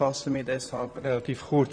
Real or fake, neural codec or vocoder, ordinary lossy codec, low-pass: fake; vocoder, 44.1 kHz, 128 mel bands, Pupu-Vocoder; none; 9.9 kHz